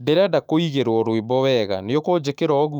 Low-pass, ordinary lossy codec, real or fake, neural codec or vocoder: 19.8 kHz; none; fake; autoencoder, 48 kHz, 128 numbers a frame, DAC-VAE, trained on Japanese speech